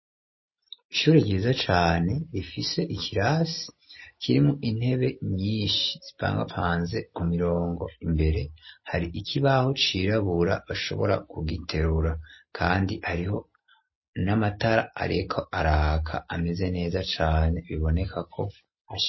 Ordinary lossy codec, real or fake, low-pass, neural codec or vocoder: MP3, 24 kbps; real; 7.2 kHz; none